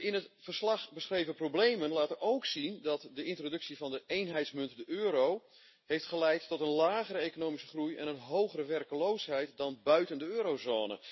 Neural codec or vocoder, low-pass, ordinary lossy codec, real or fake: none; 7.2 kHz; MP3, 24 kbps; real